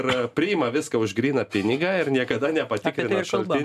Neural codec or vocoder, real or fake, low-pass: none; real; 14.4 kHz